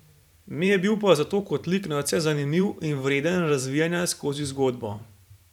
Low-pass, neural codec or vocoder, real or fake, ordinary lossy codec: 19.8 kHz; vocoder, 44.1 kHz, 128 mel bands every 512 samples, BigVGAN v2; fake; none